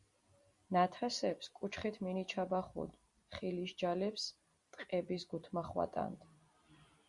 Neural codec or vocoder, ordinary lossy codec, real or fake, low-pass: vocoder, 44.1 kHz, 128 mel bands every 256 samples, BigVGAN v2; Opus, 64 kbps; fake; 10.8 kHz